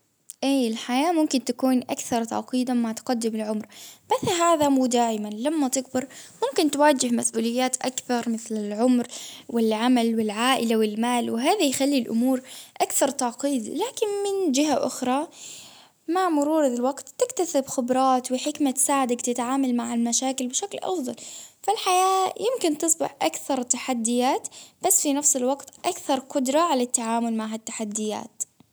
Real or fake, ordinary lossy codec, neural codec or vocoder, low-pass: real; none; none; none